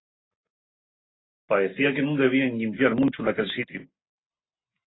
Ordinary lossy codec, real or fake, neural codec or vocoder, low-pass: AAC, 16 kbps; real; none; 7.2 kHz